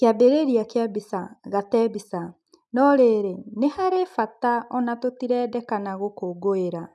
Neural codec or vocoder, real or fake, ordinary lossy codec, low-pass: none; real; none; none